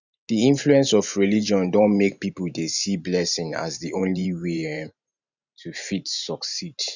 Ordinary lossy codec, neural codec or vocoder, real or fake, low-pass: none; vocoder, 44.1 kHz, 128 mel bands every 512 samples, BigVGAN v2; fake; 7.2 kHz